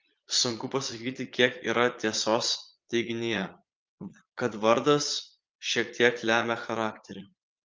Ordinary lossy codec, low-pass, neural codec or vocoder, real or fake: Opus, 24 kbps; 7.2 kHz; vocoder, 22.05 kHz, 80 mel bands, Vocos; fake